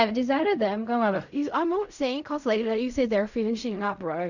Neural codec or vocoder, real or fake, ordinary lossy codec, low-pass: codec, 16 kHz in and 24 kHz out, 0.4 kbps, LongCat-Audio-Codec, fine tuned four codebook decoder; fake; none; 7.2 kHz